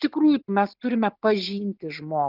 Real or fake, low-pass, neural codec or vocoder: real; 5.4 kHz; none